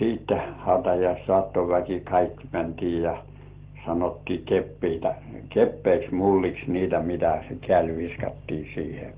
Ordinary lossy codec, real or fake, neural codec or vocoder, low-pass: Opus, 16 kbps; real; none; 3.6 kHz